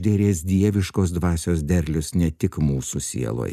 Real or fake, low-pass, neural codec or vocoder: real; 14.4 kHz; none